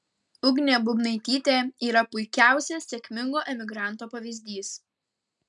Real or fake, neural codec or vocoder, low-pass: real; none; 10.8 kHz